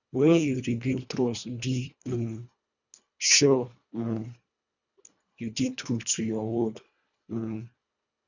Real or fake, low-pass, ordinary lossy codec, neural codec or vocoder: fake; 7.2 kHz; none; codec, 24 kHz, 1.5 kbps, HILCodec